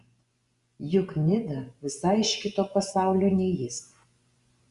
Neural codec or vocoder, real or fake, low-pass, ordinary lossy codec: vocoder, 24 kHz, 100 mel bands, Vocos; fake; 10.8 kHz; Opus, 64 kbps